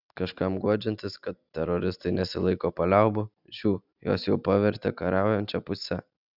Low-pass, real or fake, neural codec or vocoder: 5.4 kHz; real; none